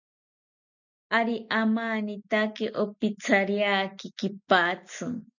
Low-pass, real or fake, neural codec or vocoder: 7.2 kHz; real; none